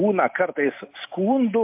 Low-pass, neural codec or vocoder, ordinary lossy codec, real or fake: 3.6 kHz; none; MP3, 32 kbps; real